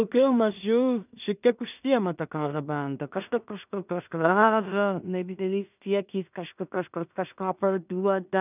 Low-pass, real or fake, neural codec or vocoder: 3.6 kHz; fake; codec, 16 kHz in and 24 kHz out, 0.4 kbps, LongCat-Audio-Codec, two codebook decoder